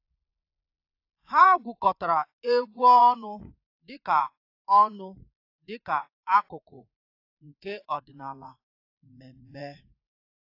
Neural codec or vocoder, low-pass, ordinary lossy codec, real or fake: vocoder, 44.1 kHz, 80 mel bands, Vocos; 5.4 kHz; AAC, 32 kbps; fake